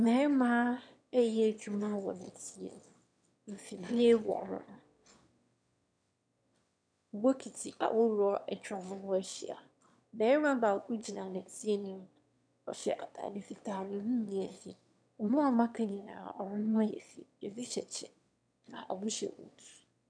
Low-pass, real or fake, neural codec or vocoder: 9.9 kHz; fake; autoencoder, 22.05 kHz, a latent of 192 numbers a frame, VITS, trained on one speaker